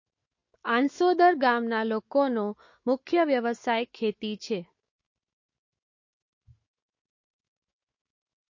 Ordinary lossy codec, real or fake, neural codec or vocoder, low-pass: MP3, 32 kbps; fake; autoencoder, 48 kHz, 128 numbers a frame, DAC-VAE, trained on Japanese speech; 7.2 kHz